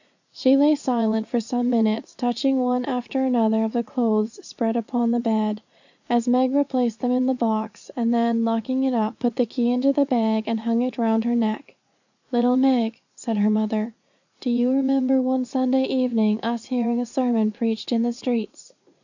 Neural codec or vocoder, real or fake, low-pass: vocoder, 44.1 kHz, 80 mel bands, Vocos; fake; 7.2 kHz